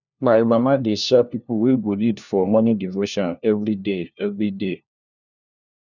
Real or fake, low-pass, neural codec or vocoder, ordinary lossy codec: fake; 7.2 kHz; codec, 16 kHz, 1 kbps, FunCodec, trained on LibriTTS, 50 frames a second; none